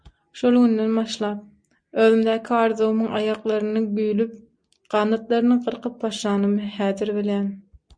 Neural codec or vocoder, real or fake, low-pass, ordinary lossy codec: none; real; 9.9 kHz; MP3, 96 kbps